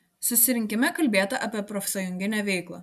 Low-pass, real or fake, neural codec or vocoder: 14.4 kHz; real; none